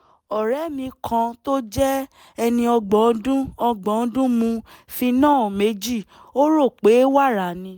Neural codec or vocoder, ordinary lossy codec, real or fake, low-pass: none; none; real; none